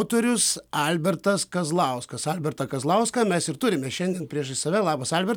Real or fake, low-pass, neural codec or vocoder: real; 19.8 kHz; none